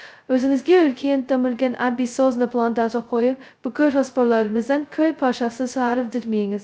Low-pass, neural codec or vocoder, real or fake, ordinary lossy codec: none; codec, 16 kHz, 0.2 kbps, FocalCodec; fake; none